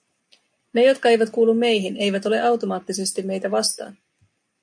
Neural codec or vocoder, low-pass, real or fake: none; 9.9 kHz; real